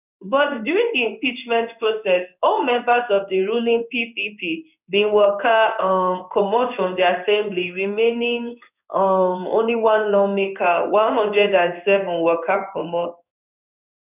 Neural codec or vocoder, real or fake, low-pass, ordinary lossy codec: codec, 16 kHz in and 24 kHz out, 1 kbps, XY-Tokenizer; fake; 3.6 kHz; none